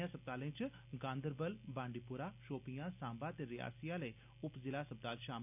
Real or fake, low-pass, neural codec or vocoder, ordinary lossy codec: real; 3.6 kHz; none; none